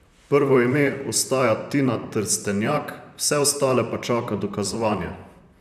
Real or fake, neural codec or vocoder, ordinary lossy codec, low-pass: fake; vocoder, 44.1 kHz, 128 mel bands, Pupu-Vocoder; none; 14.4 kHz